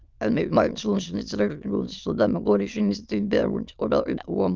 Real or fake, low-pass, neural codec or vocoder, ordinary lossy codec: fake; 7.2 kHz; autoencoder, 22.05 kHz, a latent of 192 numbers a frame, VITS, trained on many speakers; Opus, 24 kbps